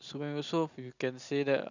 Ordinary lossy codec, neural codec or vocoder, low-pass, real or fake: none; none; 7.2 kHz; real